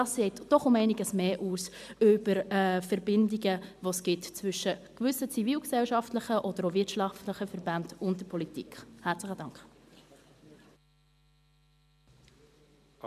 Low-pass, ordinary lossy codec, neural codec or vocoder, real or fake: 14.4 kHz; MP3, 96 kbps; none; real